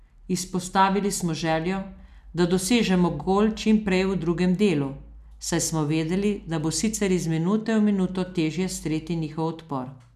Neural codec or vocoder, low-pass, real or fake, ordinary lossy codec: none; 14.4 kHz; real; none